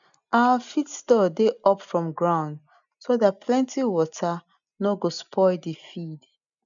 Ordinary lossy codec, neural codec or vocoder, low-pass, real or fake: none; none; 7.2 kHz; real